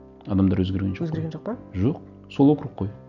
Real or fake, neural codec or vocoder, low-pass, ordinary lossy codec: real; none; 7.2 kHz; none